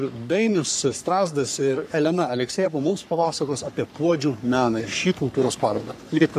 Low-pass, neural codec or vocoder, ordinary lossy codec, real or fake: 14.4 kHz; codec, 44.1 kHz, 3.4 kbps, Pupu-Codec; AAC, 96 kbps; fake